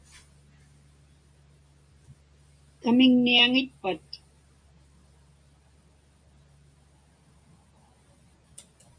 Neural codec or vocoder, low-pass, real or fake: none; 9.9 kHz; real